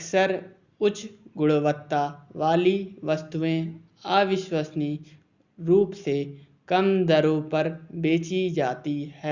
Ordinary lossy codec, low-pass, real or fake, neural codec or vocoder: Opus, 64 kbps; 7.2 kHz; real; none